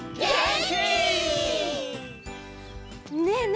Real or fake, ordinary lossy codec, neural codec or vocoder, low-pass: real; none; none; none